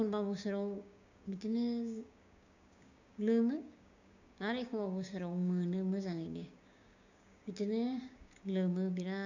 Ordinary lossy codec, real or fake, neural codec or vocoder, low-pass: none; fake; codec, 16 kHz, 6 kbps, DAC; 7.2 kHz